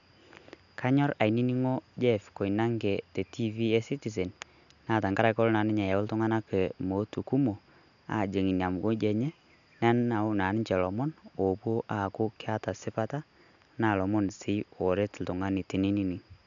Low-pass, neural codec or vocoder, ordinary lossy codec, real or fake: 7.2 kHz; none; none; real